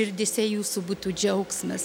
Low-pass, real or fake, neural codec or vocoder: 19.8 kHz; real; none